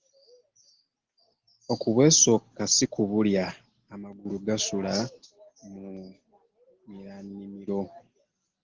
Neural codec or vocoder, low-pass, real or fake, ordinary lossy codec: none; 7.2 kHz; real; Opus, 16 kbps